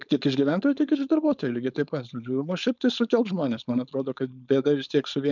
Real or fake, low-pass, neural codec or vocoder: fake; 7.2 kHz; codec, 16 kHz, 4.8 kbps, FACodec